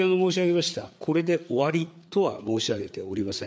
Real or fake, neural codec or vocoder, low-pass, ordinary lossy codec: fake; codec, 16 kHz, 4 kbps, FreqCodec, larger model; none; none